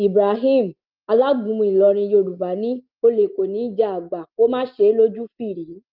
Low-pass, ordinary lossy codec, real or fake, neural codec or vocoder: 5.4 kHz; Opus, 24 kbps; real; none